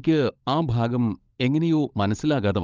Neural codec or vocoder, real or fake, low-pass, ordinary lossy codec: codec, 16 kHz, 16 kbps, FunCodec, trained on LibriTTS, 50 frames a second; fake; 7.2 kHz; Opus, 24 kbps